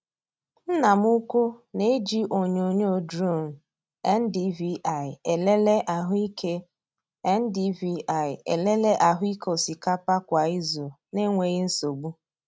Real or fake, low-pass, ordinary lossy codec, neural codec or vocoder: real; none; none; none